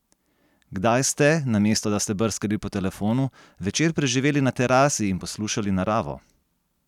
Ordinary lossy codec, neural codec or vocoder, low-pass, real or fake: none; none; 19.8 kHz; real